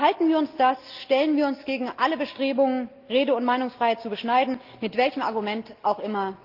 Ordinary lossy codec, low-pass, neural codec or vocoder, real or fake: Opus, 32 kbps; 5.4 kHz; none; real